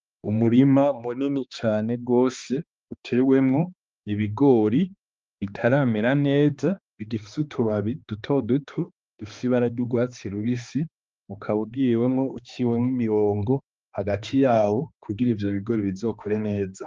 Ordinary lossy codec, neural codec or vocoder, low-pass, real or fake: Opus, 24 kbps; codec, 16 kHz, 2 kbps, X-Codec, HuBERT features, trained on balanced general audio; 7.2 kHz; fake